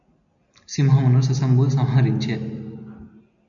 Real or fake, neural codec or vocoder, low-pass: real; none; 7.2 kHz